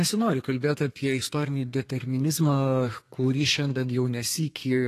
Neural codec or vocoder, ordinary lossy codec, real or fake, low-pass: codec, 32 kHz, 1.9 kbps, SNAC; AAC, 48 kbps; fake; 14.4 kHz